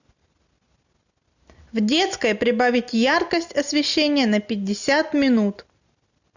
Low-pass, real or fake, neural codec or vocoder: 7.2 kHz; real; none